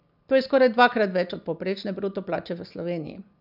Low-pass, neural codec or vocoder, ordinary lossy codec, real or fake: 5.4 kHz; none; none; real